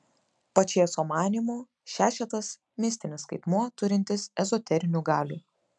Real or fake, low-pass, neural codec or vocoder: real; 10.8 kHz; none